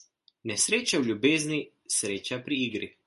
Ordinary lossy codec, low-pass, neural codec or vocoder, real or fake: MP3, 48 kbps; 14.4 kHz; none; real